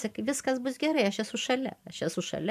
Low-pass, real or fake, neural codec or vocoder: 14.4 kHz; fake; vocoder, 44.1 kHz, 128 mel bands every 512 samples, BigVGAN v2